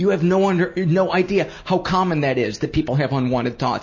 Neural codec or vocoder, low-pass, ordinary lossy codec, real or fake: none; 7.2 kHz; MP3, 32 kbps; real